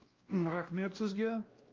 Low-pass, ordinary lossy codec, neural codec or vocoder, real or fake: 7.2 kHz; Opus, 32 kbps; codec, 16 kHz, 1 kbps, X-Codec, WavLM features, trained on Multilingual LibriSpeech; fake